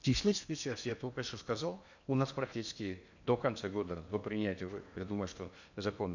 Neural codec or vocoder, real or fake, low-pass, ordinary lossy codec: codec, 16 kHz in and 24 kHz out, 0.8 kbps, FocalCodec, streaming, 65536 codes; fake; 7.2 kHz; none